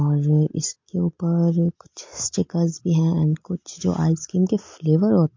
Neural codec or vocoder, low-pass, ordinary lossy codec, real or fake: none; 7.2 kHz; MP3, 48 kbps; real